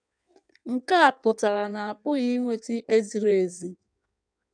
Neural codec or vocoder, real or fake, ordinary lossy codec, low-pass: codec, 16 kHz in and 24 kHz out, 1.1 kbps, FireRedTTS-2 codec; fake; none; 9.9 kHz